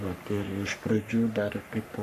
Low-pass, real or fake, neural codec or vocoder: 14.4 kHz; fake; codec, 44.1 kHz, 3.4 kbps, Pupu-Codec